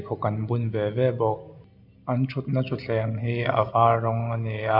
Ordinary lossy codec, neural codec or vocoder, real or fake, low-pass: none; none; real; 5.4 kHz